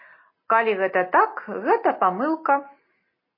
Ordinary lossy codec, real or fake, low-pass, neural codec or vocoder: MP3, 24 kbps; real; 5.4 kHz; none